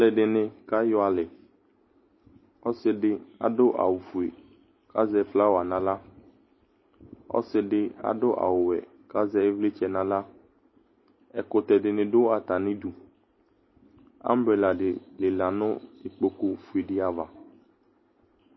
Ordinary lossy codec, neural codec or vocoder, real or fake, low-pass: MP3, 24 kbps; none; real; 7.2 kHz